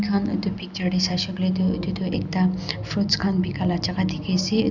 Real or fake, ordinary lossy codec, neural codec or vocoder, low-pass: real; none; none; 7.2 kHz